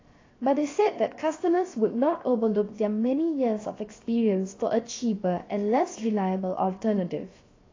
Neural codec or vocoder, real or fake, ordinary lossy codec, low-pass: codec, 16 kHz, 0.7 kbps, FocalCodec; fake; AAC, 32 kbps; 7.2 kHz